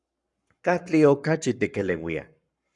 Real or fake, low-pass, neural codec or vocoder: fake; 10.8 kHz; codec, 44.1 kHz, 7.8 kbps, Pupu-Codec